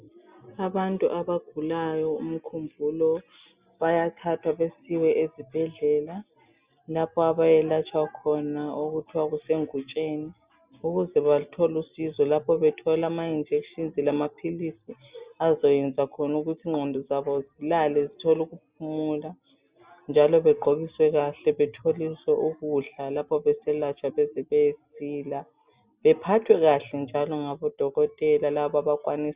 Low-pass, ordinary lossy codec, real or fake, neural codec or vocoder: 3.6 kHz; Opus, 64 kbps; real; none